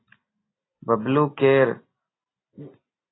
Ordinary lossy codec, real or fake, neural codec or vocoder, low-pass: AAC, 16 kbps; real; none; 7.2 kHz